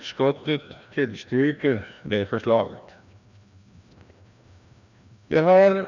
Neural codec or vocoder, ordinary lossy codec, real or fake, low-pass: codec, 16 kHz, 1 kbps, FreqCodec, larger model; none; fake; 7.2 kHz